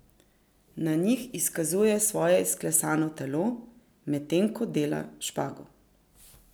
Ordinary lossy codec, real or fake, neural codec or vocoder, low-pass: none; real; none; none